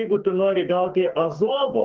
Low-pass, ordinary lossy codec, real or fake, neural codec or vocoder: 7.2 kHz; Opus, 16 kbps; fake; codec, 44.1 kHz, 2.6 kbps, SNAC